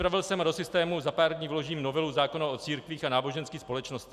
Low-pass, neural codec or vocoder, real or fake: 14.4 kHz; none; real